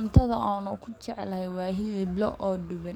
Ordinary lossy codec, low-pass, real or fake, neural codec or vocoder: none; 19.8 kHz; fake; codec, 44.1 kHz, 7.8 kbps, DAC